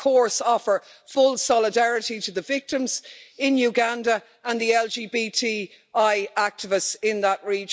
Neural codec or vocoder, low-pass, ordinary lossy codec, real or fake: none; none; none; real